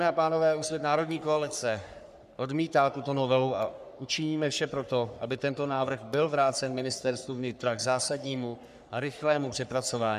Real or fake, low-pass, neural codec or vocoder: fake; 14.4 kHz; codec, 44.1 kHz, 3.4 kbps, Pupu-Codec